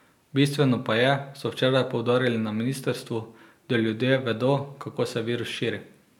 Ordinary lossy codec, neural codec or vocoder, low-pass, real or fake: none; none; 19.8 kHz; real